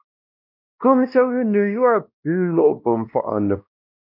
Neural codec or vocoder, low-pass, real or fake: codec, 16 kHz, 1 kbps, X-Codec, WavLM features, trained on Multilingual LibriSpeech; 5.4 kHz; fake